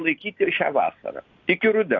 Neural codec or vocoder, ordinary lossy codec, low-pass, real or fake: none; AAC, 48 kbps; 7.2 kHz; real